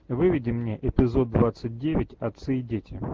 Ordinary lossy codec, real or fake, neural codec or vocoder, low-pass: Opus, 16 kbps; real; none; 7.2 kHz